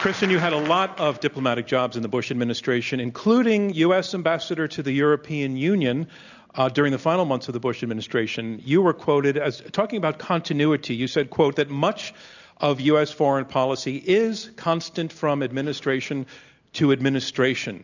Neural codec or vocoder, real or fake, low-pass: none; real; 7.2 kHz